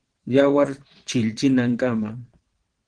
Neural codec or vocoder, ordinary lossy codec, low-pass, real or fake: vocoder, 22.05 kHz, 80 mel bands, WaveNeXt; Opus, 16 kbps; 9.9 kHz; fake